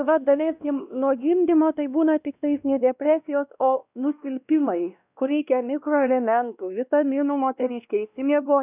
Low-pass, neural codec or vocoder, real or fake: 3.6 kHz; codec, 16 kHz, 1 kbps, X-Codec, WavLM features, trained on Multilingual LibriSpeech; fake